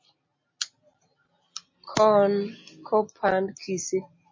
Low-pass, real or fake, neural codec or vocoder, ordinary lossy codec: 7.2 kHz; real; none; MP3, 32 kbps